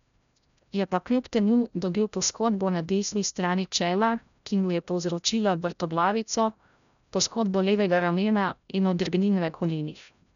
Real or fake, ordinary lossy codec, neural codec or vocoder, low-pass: fake; none; codec, 16 kHz, 0.5 kbps, FreqCodec, larger model; 7.2 kHz